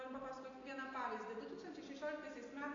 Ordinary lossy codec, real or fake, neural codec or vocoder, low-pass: AAC, 32 kbps; real; none; 7.2 kHz